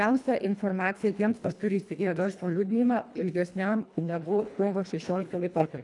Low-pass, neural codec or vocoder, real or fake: 10.8 kHz; codec, 24 kHz, 1.5 kbps, HILCodec; fake